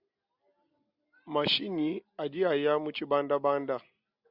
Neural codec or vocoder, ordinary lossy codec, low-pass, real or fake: none; Opus, 64 kbps; 5.4 kHz; real